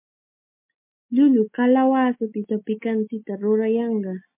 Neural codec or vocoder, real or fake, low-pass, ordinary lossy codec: none; real; 3.6 kHz; MP3, 24 kbps